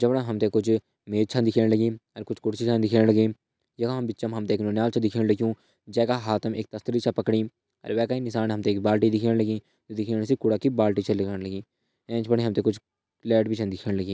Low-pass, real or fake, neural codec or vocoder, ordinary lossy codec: none; real; none; none